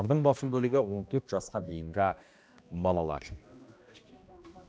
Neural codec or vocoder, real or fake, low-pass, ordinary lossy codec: codec, 16 kHz, 1 kbps, X-Codec, HuBERT features, trained on balanced general audio; fake; none; none